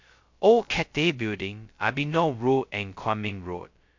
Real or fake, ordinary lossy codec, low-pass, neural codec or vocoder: fake; MP3, 48 kbps; 7.2 kHz; codec, 16 kHz, 0.2 kbps, FocalCodec